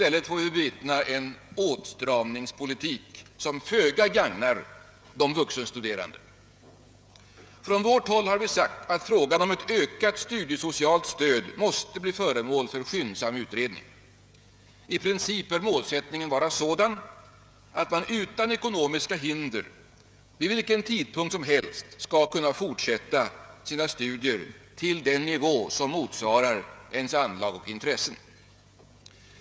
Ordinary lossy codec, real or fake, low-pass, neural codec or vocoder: none; fake; none; codec, 16 kHz, 16 kbps, FreqCodec, smaller model